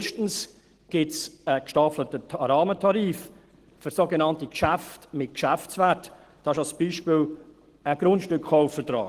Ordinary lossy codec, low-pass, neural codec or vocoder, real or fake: Opus, 16 kbps; 14.4 kHz; none; real